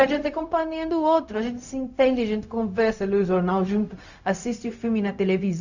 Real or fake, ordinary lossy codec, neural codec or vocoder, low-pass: fake; none; codec, 16 kHz, 0.4 kbps, LongCat-Audio-Codec; 7.2 kHz